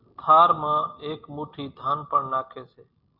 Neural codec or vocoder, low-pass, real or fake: none; 5.4 kHz; real